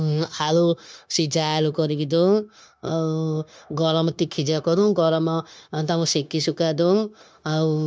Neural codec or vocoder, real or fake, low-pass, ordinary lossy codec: codec, 16 kHz, 0.9 kbps, LongCat-Audio-Codec; fake; none; none